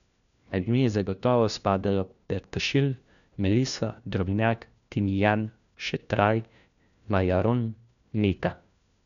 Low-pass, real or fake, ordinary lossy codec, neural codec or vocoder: 7.2 kHz; fake; none; codec, 16 kHz, 1 kbps, FunCodec, trained on LibriTTS, 50 frames a second